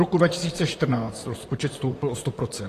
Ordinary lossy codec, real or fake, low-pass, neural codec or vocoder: AAC, 48 kbps; fake; 14.4 kHz; vocoder, 44.1 kHz, 128 mel bands, Pupu-Vocoder